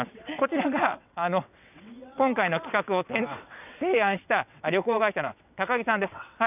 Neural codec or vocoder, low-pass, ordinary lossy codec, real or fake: vocoder, 22.05 kHz, 80 mel bands, WaveNeXt; 3.6 kHz; none; fake